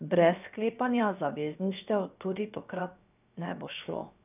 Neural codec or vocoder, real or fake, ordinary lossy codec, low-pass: codec, 16 kHz, 0.7 kbps, FocalCodec; fake; none; 3.6 kHz